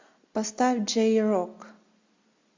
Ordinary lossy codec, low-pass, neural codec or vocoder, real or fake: MP3, 48 kbps; 7.2 kHz; none; real